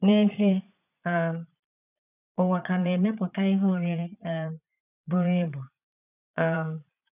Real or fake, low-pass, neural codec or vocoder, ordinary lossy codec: fake; 3.6 kHz; vocoder, 22.05 kHz, 80 mel bands, Vocos; none